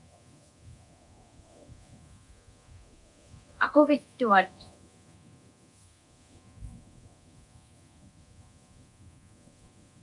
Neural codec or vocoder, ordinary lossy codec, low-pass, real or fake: codec, 24 kHz, 0.9 kbps, DualCodec; MP3, 96 kbps; 10.8 kHz; fake